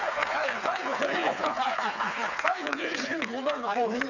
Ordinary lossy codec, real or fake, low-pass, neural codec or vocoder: none; fake; 7.2 kHz; codec, 16 kHz, 4 kbps, FreqCodec, smaller model